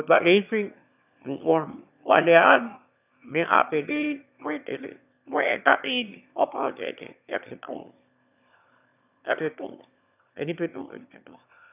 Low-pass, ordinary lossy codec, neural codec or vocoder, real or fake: 3.6 kHz; none; autoencoder, 22.05 kHz, a latent of 192 numbers a frame, VITS, trained on one speaker; fake